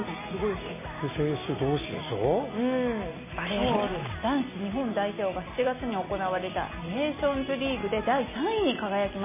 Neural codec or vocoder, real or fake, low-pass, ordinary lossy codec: none; real; 3.6 kHz; MP3, 16 kbps